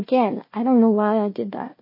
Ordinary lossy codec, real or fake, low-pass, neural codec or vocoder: MP3, 24 kbps; fake; 5.4 kHz; codec, 16 kHz, 1 kbps, FunCodec, trained on Chinese and English, 50 frames a second